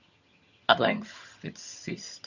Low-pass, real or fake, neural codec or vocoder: 7.2 kHz; fake; vocoder, 22.05 kHz, 80 mel bands, HiFi-GAN